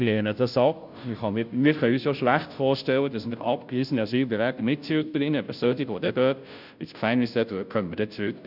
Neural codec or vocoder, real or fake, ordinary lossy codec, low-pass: codec, 16 kHz, 0.5 kbps, FunCodec, trained on Chinese and English, 25 frames a second; fake; none; 5.4 kHz